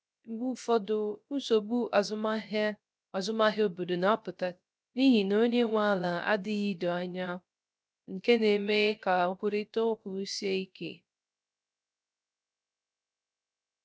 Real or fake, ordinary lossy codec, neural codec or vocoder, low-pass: fake; none; codec, 16 kHz, 0.3 kbps, FocalCodec; none